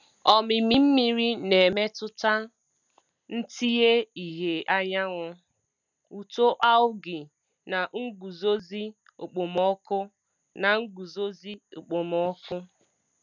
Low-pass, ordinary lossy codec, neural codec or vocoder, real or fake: 7.2 kHz; none; none; real